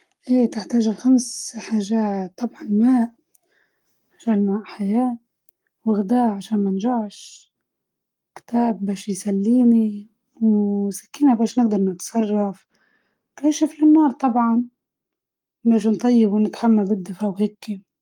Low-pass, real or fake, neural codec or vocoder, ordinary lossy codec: 19.8 kHz; fake; codec, 44.1 kHz, 7.8 kbps, Pupu-Codec; Opus, 32 kbps